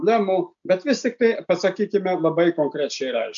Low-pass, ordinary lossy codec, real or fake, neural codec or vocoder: 7.2 kHz; MP3, 96 kbps; real; none